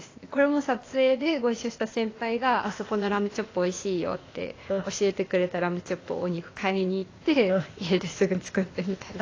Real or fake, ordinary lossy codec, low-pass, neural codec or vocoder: fake; AAC, 32 kbps; 7.2 kHz; codec, 16 kHz, 0.8 kbps, ZipCodec